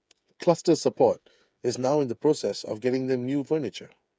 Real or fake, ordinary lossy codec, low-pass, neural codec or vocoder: fake; none; none; codec, 16 kHz, 8 kbps, FreqCodec, smaller model